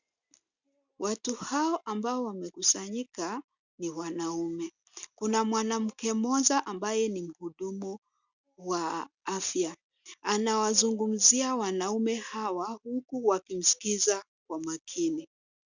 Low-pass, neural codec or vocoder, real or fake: 7.2 kHz; none; real